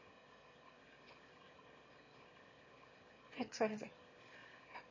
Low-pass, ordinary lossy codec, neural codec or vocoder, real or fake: 7.2 kHz; MP3, 32 kbps; autoencoder, 22.05 kHz, a latent of 192 numbers a frame, VITS, trained on one speaker; fake